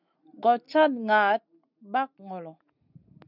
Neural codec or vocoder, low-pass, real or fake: none; 5.4 kHz; real